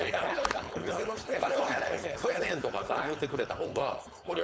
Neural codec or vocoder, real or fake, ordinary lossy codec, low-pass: codec, 16 kHz, 4.8 kbps, FACodec; fake; none; none